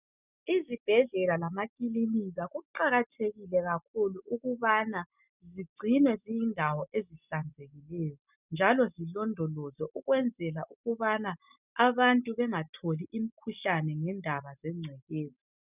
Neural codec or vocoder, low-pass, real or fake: none; 3.6 kHz; real